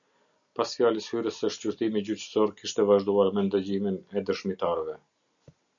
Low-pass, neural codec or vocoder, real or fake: 7.2 kHz; none; real